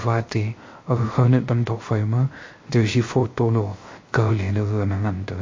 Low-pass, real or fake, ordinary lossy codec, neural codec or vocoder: 7.2 kHz; fake; MP3, 32 kbps; codec, 16 kHz, 0.3 kbps, FocalCodec